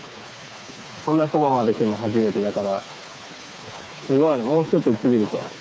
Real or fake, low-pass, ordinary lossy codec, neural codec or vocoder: fake; none; none; codec, 16 kHz, 4 kbps, FreqCodec, smaller model